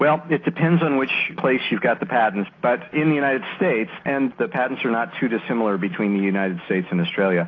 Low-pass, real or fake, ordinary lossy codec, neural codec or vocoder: 7.2 kHz; real; AAC, 32 kbps; none